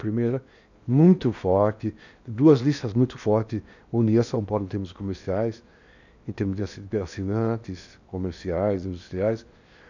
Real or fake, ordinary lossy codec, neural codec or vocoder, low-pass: fake; none; codec, 16 kHz in and 24 kHz out, 0.8 kbps, FocalCodec, streaming, 65536 codes; 7.2 kHz